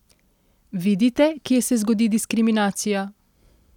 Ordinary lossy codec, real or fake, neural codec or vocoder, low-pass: none; real; none; 19.8 kHz